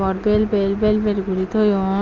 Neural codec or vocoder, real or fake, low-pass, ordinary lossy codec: none; real; 7.2 kHz; Opus, 24 kbps